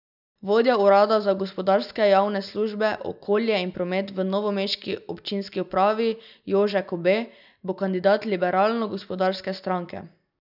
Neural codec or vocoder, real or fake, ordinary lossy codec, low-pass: none; real; none; 5.4 kHz